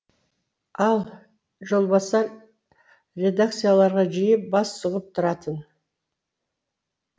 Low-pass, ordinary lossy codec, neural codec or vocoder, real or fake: none; none; none; real